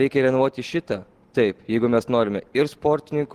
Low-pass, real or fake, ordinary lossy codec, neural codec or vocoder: 14.4 kHz; real; Opus, 16 kbps; none